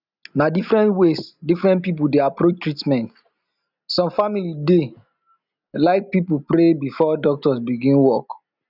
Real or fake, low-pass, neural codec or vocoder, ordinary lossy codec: real; 5.4 kHz; none; none